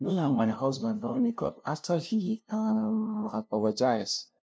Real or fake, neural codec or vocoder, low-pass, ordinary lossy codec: fake; codec, 16 kHz, 0.5 kbps, FunCodec, trained on LibriTTS, 25 frames a second; none; none